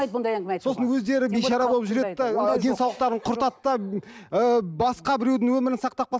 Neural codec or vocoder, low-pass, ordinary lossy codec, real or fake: none; none; none; real